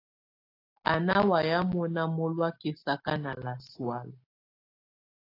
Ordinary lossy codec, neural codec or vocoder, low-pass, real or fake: AAC, 32 kbps; none; 5.4 kHz; real